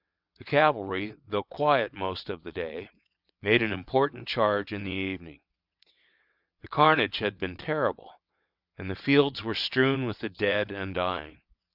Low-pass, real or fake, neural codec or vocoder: 5.4 kHz; fake; vocoder, 22.05 kHz, 80 mel bands, WaveNeXt